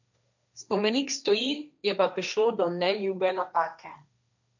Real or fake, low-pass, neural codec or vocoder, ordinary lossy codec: fake; 7.2 kHz; codec, 16 kHz, 1.1 kbps, Voila-Tokenizer; none